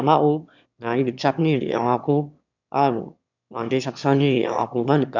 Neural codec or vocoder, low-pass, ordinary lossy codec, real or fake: autoencoder, 22.05 kHz, a latent of 192 numbers a frame, VITS, trained on one speaker; 7.2 kHz; none; fake